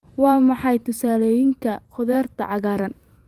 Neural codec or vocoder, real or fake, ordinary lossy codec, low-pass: vocoder, 44.1 kHz, 128 mel bands every 512 samples, BigVGAN v2; fake; Opus, 32 kbps; 14.4 kHz